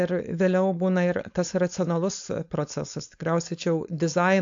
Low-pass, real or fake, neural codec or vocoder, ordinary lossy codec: 7.2 kHz; fake; codec, 16 kHz, 4.8 kbps, FACodec; AAC, 64 kbps